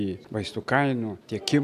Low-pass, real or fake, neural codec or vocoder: 14.4 kHz; real; none